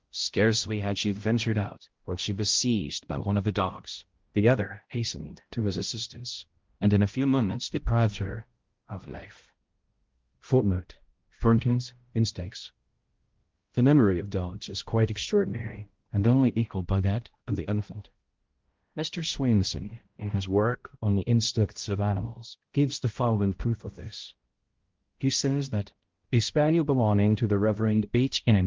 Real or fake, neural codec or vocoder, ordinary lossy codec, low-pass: fake; codec, 16 kHz, 0.5 kbps, X-Codec, HuBERT features, trained on balanced general audio; Opus, 16 kbps; 7.2 kHz